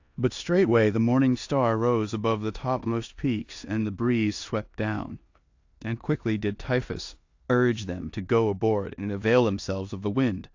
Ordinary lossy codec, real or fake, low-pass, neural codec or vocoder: AAC, 48 kbps; fake; 7.2 kHz; codec, 16 kHz in and 24 kHz out, 0.9 kbps, LongCat-Audio-Codec, four codebook decoder